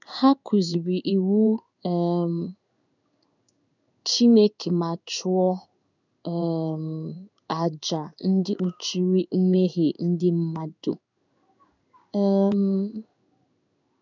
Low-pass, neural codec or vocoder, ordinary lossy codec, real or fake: 7.2 kHz; codec, 16 kHz in and 24 kHz out, 1 kbps, XY-Tokenizer; none; fake